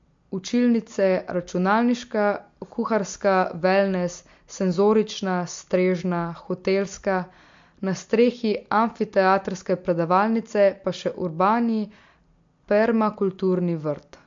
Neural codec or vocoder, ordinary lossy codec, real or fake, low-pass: none; MP3, 48 kbps; real; 7.2 kHz